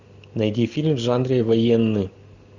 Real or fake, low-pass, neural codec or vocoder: real; 7.2 kHz; none